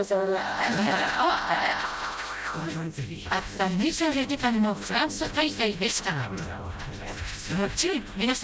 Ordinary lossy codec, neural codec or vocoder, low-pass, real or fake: none; codec, 16 kHz, 0.5 kbps, FreqCodec, smaller model; none; fake